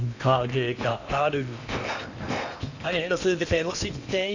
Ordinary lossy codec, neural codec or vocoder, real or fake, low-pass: none; codec, 16 kHz in and 24 kHz out, 0.8 kbps, FocalCodec, streaming, 65536 codes; fake; 7.2 kHz